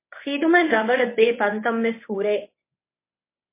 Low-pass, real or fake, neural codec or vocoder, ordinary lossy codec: 3.6 kHz; fake; codec, 24 kHz, 0.9 kbps, WavTokenizer, medium speech release version 1; MP3, 24 kbps